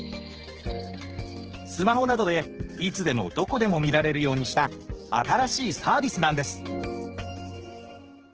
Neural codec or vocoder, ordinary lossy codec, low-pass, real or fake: codec, 16 kHz, 4 kbps, X-Codec, HuBERT features, trained on general audio; Opus, 16 kbps; 7.2 kHz; fake